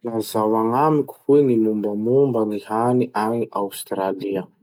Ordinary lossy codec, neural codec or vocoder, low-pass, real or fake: none; none; 19.8 kHz; real